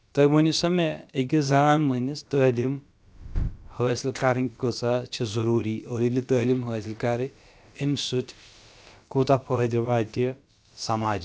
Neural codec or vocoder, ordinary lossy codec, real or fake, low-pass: codec, 16 kHz, about 1 kbps, DyCAST, with the encoder's durations; none; fake; none